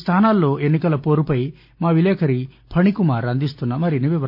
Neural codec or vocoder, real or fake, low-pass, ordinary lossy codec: none; real; 5.4 kHz; none